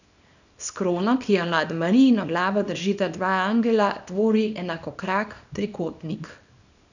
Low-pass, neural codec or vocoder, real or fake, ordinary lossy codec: 7.2 kHz; codec, 24 kHz, 0.9 kbps, WavTokenizer, small release; fake; none